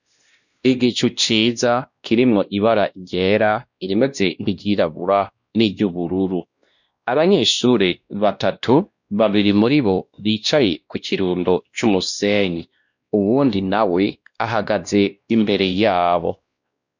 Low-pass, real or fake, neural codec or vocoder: 7.2 kHz; fake; codec, 16 kHz, 1 kbps, X-Codec, WavLM features, trained on Multilingual LibriSpeech